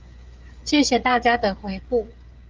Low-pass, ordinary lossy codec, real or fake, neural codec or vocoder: 7.2 kHz; Opus, 24 kbps; fake; codec, 16 kHz, 16 kbps, FreqCodec, smaller model